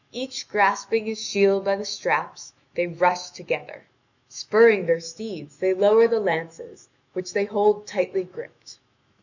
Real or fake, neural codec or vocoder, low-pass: fake; autoencoder, 48 kHz, 128 numbers a frame, DAC-VAE, trained on Japanese speech; 7.2 kHz